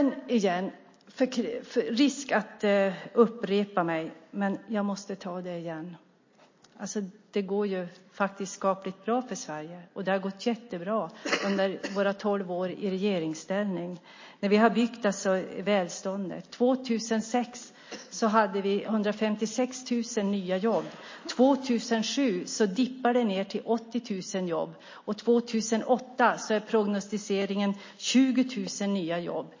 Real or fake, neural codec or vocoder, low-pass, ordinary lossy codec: real; none; 7.2 kHz; MP3, 32 kbps